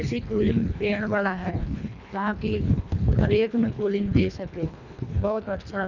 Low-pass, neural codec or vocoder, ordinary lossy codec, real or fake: 7.2 kHz; codec, 24 kHz, 1.5 kbps, HILCodec; none; fake